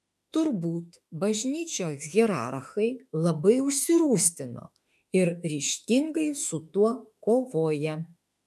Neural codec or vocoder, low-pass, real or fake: autoencoder, 48 kHz, 32 numbers a frame, DAC-VAE, trained on Japanese speech; 14.4 kHz; fake